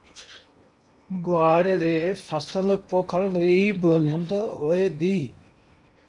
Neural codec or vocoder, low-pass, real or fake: codec, 16 kHz in and 24 kHz out, 0.8 kbps, FocalCodec, streaming, 65536 codes; 10.8 kHz; fake